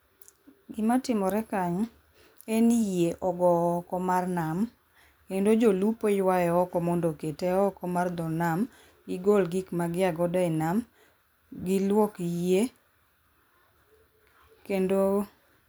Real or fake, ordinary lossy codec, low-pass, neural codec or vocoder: fake; none; none; vocoder, 44.1 kHz, 128 mel bands every 256 samples, BigVGAN v2